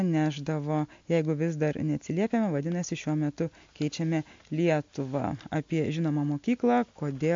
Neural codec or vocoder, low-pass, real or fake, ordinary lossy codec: none; 7.2 kHz; real; MP3, 48 kbps